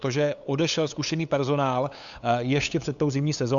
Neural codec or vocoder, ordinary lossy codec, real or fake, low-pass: codec, 16 kHz, 8 kbps, FunCodec, trained on LibriTTS, 25 frames a second; Opus, 64 kbps; fake; 7.2 kHz